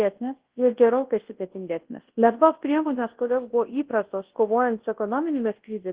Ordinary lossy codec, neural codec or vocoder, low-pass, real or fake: Opus, 16 kbps; codec, 24 kHz, 0.9 kbps, WavTokenizer, large speech release; 3.6 kHz; fake